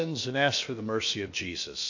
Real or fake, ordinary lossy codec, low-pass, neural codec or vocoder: fake; AAC, 48 kbps; 7.2 kHz; codec, 16 kHz, about 1 kbps, DyCAST, with the encoder's durations